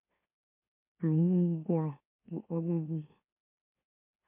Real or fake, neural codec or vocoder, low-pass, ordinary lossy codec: fake; autoencoder, 44.1 kHz, a latent of 192 numbers a frame, MeloTTS; 3.6 kHz; MP3, 32 kbps